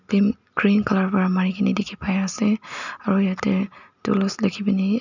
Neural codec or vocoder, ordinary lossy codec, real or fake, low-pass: none; none; real; 7.2 kHz